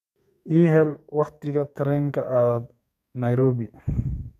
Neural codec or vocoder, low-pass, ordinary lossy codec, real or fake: codec, 32 kHz, 1.9 kbps, SNAC; 14.4 kHz; none; fake